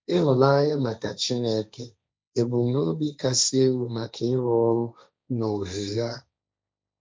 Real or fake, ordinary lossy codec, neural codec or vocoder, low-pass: fake; none; codec, 16 kHz, 1.1 kbps, Voila-Tokenizer; none